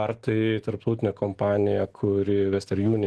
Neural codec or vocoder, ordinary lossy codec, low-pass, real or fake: none; Opus, 16 kbps; 10.8 kHz; real